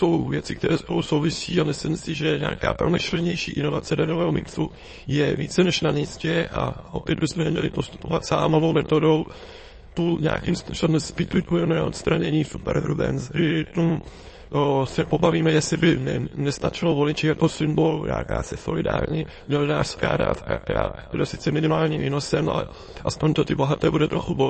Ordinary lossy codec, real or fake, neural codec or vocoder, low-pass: MP3, 32 kbps; fake; autoencoder, 22.05 kHz, a latent of 192 numbers a frame, VITS, trained on many speakers; 9.9 kHz